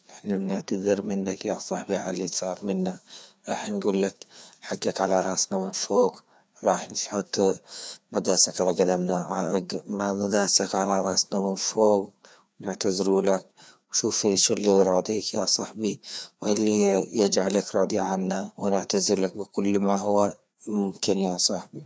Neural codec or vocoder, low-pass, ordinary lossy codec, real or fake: codec, 16 kHz, 2 kbps, FreqCodec, larger model; none; none; fake